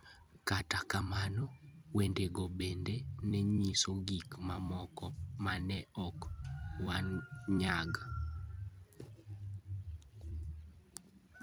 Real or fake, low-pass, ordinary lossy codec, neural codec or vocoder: fake; none; none; vocoder, 44.1 kHz, 128 mel bands every 256 samples, BigVGAN v2